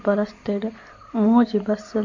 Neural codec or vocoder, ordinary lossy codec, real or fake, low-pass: none; MP3, 48 kbps; real; 7.2 kHz